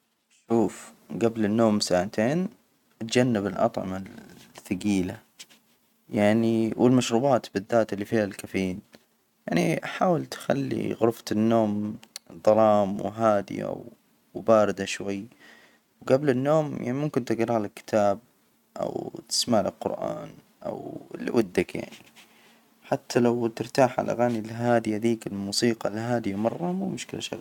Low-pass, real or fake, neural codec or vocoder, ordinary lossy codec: 19.8 kHz; real; none; none